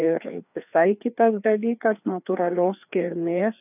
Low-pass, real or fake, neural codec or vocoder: 3.6 kHz; fake; codec, 16 kHz, 2 kbps, FreqCodec, larger model